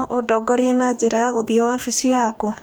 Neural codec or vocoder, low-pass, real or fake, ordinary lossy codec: codec, 44.1 kHz, 2.6 kbps, DAC; none; fake; none